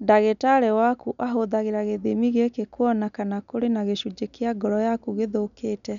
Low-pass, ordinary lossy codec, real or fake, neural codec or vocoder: 7.2 kHz; none; real; none